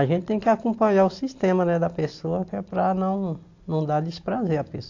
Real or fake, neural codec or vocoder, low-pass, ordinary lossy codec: real; none; 7.2 kHz; AAC, 48 kbps